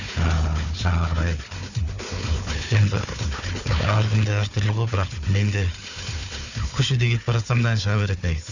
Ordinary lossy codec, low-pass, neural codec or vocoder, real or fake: none; 7.2 kHz; codec, 16 kHz, 4 kbps, FunCodec, trained on Chinese and English, 50 frames a second; fake